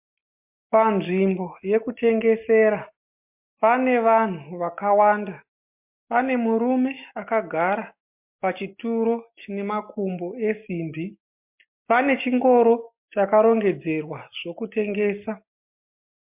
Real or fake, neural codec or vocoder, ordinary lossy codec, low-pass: real; none; MP3, 32 kbps; 3.6 kHz